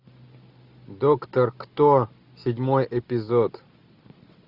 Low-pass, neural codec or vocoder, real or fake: 5.4 kHz; none; real